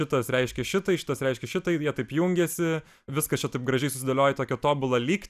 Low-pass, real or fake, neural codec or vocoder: 14.4 kHz; real; none